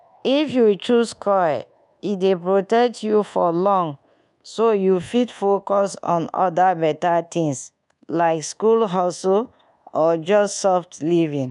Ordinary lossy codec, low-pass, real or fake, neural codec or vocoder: MP3, 96 kbps; 10.8 kHz; fake; codec, 24 kHz, 1.2 kbps, DualCodec